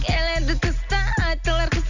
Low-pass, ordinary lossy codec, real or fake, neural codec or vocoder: 7.2 kHz; none; real; none